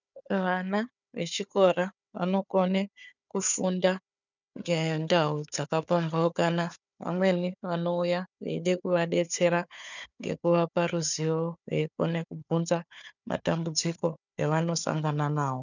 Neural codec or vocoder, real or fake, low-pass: codec, 16 kHz, 4 kbps, FunCodec, trained on Chinese and English, 50 frames a second; fake; 7.2 kHz